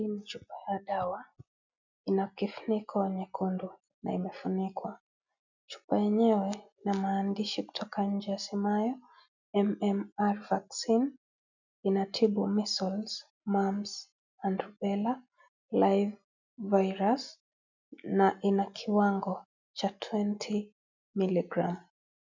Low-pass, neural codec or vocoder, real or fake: 7.2 kHz; none; real